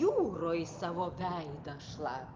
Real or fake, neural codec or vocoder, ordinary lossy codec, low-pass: real; none; Opus, 32 kbps; 7.2 kHz